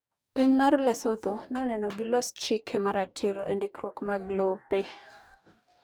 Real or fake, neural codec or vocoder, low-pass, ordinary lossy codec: fake; codec, 44.1 kHz, 2.6 kbps, DAC; none; none